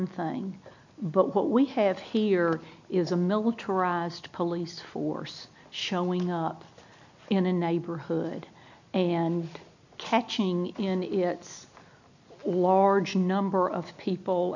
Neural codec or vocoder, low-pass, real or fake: none; 7.2 kHz; real